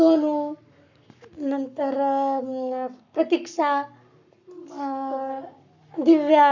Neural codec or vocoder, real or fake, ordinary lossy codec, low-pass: codec, 44.1 kHz, 7.8 kbps, Pupu-Codec; fake; none; 7.2 kHz